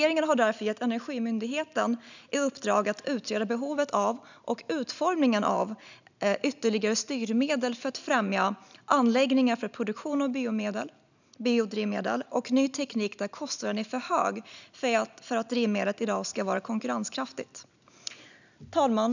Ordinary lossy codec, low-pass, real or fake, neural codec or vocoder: none; 7.2 kHz; real; none